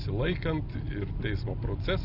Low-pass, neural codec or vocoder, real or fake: 5.4 kHz; none; real